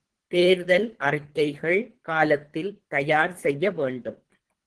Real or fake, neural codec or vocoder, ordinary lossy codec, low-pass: fake; codec, 24 kHz, 3 kbps, HILCodec; Opus, 16 kbps; 10.8 kHz